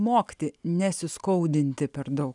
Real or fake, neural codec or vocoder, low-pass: real; none; 10.8 kHz